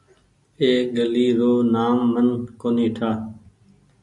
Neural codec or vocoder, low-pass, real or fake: none; 10.8 kHz; real